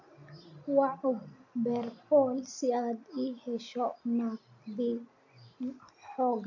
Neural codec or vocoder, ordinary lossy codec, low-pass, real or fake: none; AAC, 48 kbps; 7.2 kHz; real